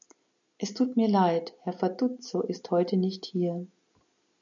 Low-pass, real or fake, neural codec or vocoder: 7.2 kHz; real; none